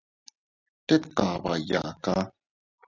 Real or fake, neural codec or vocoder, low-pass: real; none; 7.2 kHz